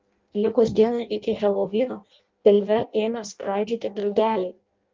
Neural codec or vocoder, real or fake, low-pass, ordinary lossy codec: codec, 16 kHz in and 24 kHz out, 0.6 kbps, FireRedTTS-2 codec; fake; 7.2 kHz; Opus, 32 kbps